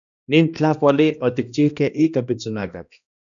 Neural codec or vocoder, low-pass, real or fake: codec, 16 kHz, 1 kbps, X-Codec, HuBERT features, trained on balanced general audio; 7.2 kHz; fake